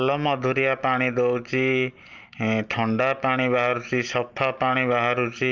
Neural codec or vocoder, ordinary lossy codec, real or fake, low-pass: none; Opus, 32 kbps; real; 7.2 kHz